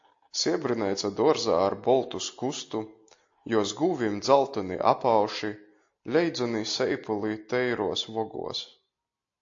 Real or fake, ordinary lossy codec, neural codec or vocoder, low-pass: real; AAC, 48 kbps; none; 7.2 kHz